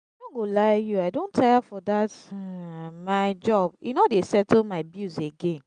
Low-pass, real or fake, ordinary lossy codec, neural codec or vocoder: 9.9 kHz; real; none; none